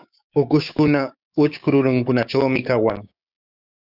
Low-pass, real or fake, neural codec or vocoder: 5.4 kHz; fake; codec, 16 kHz, 6 kbps, DAC